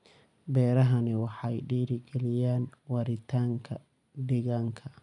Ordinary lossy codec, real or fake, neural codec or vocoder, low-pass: none; real; none; 10.8 kHz